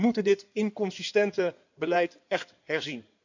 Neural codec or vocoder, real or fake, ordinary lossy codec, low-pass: codec, 16 kHz in and 24 kHz out, 2.2 kbps, FireRedTTS-2 codec; fake; none; 7.2 kHz